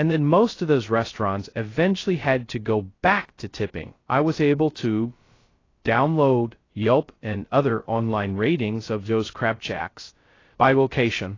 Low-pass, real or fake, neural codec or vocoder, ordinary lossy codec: 7.2 kHz; fake; codec, 16 kHz, 0.2 kbps, FocalCodec; AAC, 32 kbps